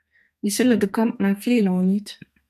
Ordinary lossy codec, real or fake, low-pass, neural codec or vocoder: MP3, 96 kbps; fake; 14.4 kHz; codec, 32 kHz, 1.9 kbps, SNAC